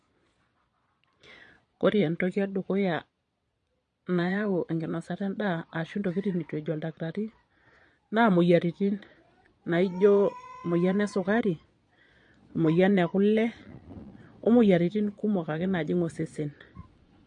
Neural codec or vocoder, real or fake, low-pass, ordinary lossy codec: vocoder, 22.05 kHz, 80 mel bands, Vocos; fake; 9.9 kHz; MP3, 48 kbps